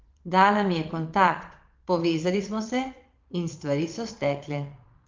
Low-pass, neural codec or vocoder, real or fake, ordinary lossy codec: 7.2 kHz; vocoder, 22.05 kHz, 80 mel bands, Vocos; fake; Opus, 32 kbps